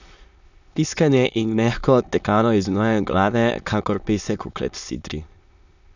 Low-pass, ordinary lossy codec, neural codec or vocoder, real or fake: 7.2 kHz; none; autoencoder, 22.05 kHz, a latent of 192 numbers a frame, VITS, trained on many speakers; fake